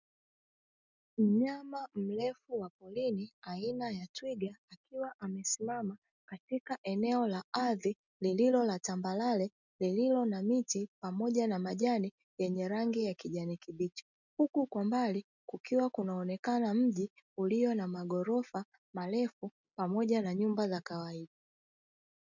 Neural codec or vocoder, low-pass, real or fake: none; 7.2 kHz; real